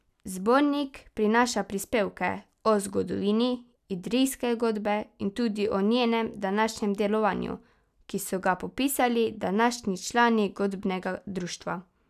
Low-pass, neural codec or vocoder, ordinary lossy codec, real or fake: 14.4 kHz; none; none; real